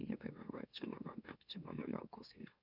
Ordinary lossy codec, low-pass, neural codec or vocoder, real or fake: none; 5.4 kHz; autoencoder, 44.1 kHz, a latent of 192 numbers a frame, MeloTTS; fake